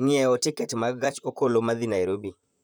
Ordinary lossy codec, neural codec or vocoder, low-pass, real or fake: none; vocoder, 44.1 kHz, 128 mel bands, Pupu-Vocoder; none; fake